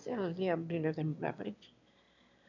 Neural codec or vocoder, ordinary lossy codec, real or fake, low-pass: autoencoder, 22.05 kHz, a latent of 192 numbers a frame, VITS, trained on one speaker; none; fake; 7.2 kHz